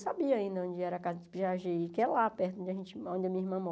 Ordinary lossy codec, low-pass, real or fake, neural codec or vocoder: none; none; real; none